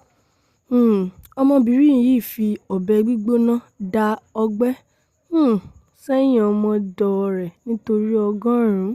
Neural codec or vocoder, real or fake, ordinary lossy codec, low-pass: none; real; Opus, 64 kbps; 14.4 kHz